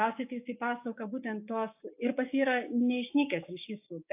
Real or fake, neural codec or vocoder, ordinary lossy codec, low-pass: fake; codec, 16 kHz, 6 kbps, DAC; AAC, 32 kbps; 3.6 kHz